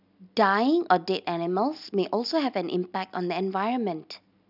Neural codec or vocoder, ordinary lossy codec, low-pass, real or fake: none; none; 5.4 kHz; real